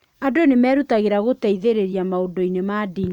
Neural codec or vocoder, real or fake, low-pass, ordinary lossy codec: vocoder, 44.1 kHz, 128 mel bands every 512 samples, BigVGAN v2; fake; 19.8 kHz; none